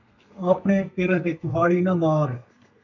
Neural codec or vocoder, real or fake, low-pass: codec, 32 kHz, 1.9 kbps, SNAC; fake; 7.2 kHz